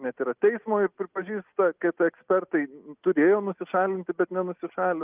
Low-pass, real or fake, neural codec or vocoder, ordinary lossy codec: 3.6 kHz; real; none; Opus, 24 kbps